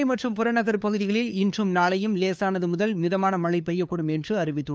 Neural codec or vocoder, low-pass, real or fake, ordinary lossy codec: codec, 16 kHz, 2 kbps, FunCodec, trained on LibriTTS, 25 frames a second; none; fake; none